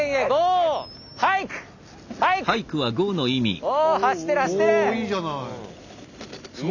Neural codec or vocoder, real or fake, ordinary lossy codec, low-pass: none; real; none; 7.2 kHz